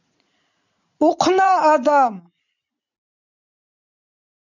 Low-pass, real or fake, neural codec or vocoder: 7.2 kHz; fake; vocoder, 44.1 kHz, 80 mel bands, Vocos